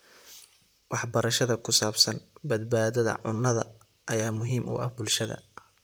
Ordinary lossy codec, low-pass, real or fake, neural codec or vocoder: none; none; fake; vocoder, 44.1 kHz, 128 mel bands, Pupu-Vocoder